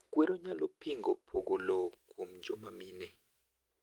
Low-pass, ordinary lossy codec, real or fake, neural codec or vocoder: 19.8 kHz; Opus, 24 kbps; fake; autoencoder, 48 kHz, 128 numbers a frame, DAC-VAE, trained on Japanese speech